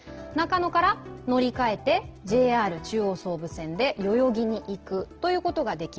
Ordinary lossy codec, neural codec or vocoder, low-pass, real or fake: Opus, 16 kbps; none; 7.2 kHz; real